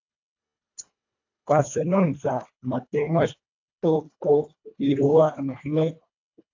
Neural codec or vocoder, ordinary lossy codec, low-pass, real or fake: codec, 24 kHz, 1.5 kbps, HILCodec; AAC, 48 kbps; 7.2 kHz; fake